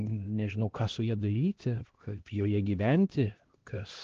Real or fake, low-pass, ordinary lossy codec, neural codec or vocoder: fake; 7.2 kHz; Opus, 16 kbps; codec, 16 kHz, 1 kbps, X-Codec, HuBERT features, trained on LibriSpeech